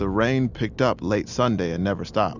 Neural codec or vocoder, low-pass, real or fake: none; 7.2 kHz; real